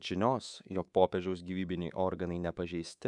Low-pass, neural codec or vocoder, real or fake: 10.8 kHz; codec, 24 kHz, 3.1 kbps, DualCodec; fake